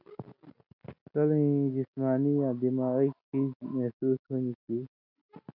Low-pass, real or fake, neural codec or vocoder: 5.4 kHz; real; none